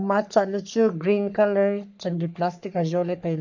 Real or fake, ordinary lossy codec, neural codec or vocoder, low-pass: fake; none; codec, 44.1 kHz, 3.4 kbps, Pupu-Codec; 7.2 kHz